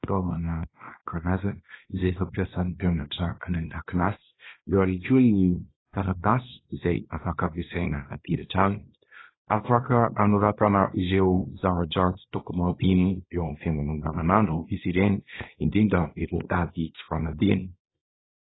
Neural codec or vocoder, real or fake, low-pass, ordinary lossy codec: codec, 24 kHz, 0.9 kbps, WavTokenizer, small release; fake; 7.2 kHz; AAC, 16 kbps